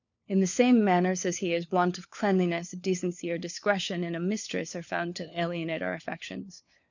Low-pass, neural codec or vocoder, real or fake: 7.2 kHz; codec, 16 kHz, 4 kbps, FunCodec, trained on LibriTTS, 50 frames a second; fake